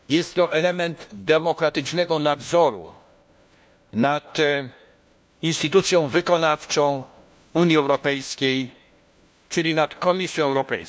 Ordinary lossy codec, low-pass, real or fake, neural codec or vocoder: none; none; fake; codec, 16 kHz, 1 kbps, FunCodec, trained on LibriTTS, 50 frames a second